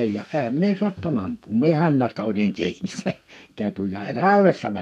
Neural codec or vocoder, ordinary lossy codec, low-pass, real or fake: codec, 32 kHz, 1.9 kbps, SNAC; none; 14.4 kHz; fake